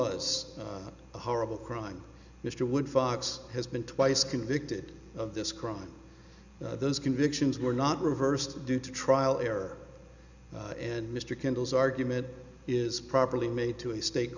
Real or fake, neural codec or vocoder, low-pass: real; none; 7.2 kHz